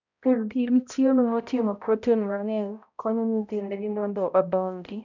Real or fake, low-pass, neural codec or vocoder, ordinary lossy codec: fake; 7.2 kHz; codec, 16 kHz, 0.5 kbps, X-Codec, HuBERT features, trained on balanced general audio; none